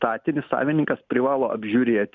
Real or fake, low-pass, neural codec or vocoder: real; 7.2 kHz; none